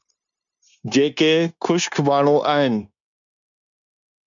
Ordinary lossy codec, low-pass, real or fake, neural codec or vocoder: MP3, 96 kbps; 7.2 kHz; fake; codec, 16 kHz, 0.9 kbps, LongCat-Audio-Codec